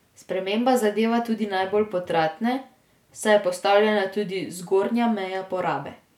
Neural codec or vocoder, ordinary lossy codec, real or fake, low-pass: none; none; real; 19.8 kHz